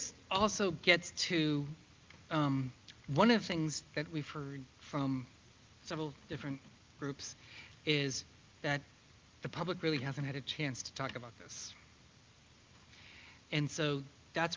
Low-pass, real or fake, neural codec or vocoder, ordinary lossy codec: 7.2 kHz; real; none; Opus, 32 kbps